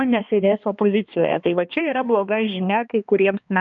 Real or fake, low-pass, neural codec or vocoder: fake; 7.2 kHz; codec, 16 kHz, 2 kbps, X-Codec, HuBERT features, trained on balanced general audio